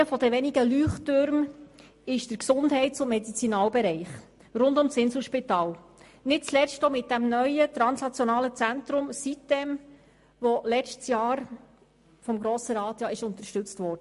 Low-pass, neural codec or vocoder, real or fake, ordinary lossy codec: 14.4 kHz; none; real; MP3, 48 kbps